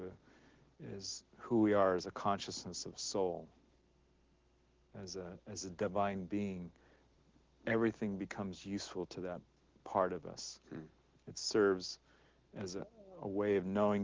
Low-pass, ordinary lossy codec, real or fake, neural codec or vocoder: 7.2 kHz; Opus, 16 kbps; real; none